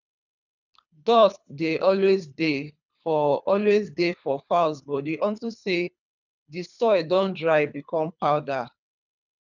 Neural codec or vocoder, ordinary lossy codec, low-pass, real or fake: codec, 24 kHz, 3 kbps, HILCodec; none; 7.2 kHz; fake